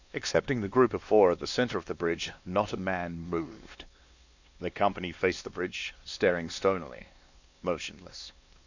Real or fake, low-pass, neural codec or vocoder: fake; 7.2 kHz; codec, 16 kHz, 2 kbps, X-Codec, WavLM features, trained on Multilingual LibriSpeech